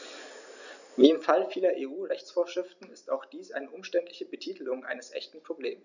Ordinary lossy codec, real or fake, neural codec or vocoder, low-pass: none; real; none; 7.2 kHz